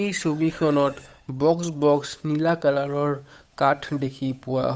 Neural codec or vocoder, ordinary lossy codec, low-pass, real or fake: codec, 16 kHz, 8 kbps, FunCodec, trained on Chinese and English, 25 frames a second; none; none; fake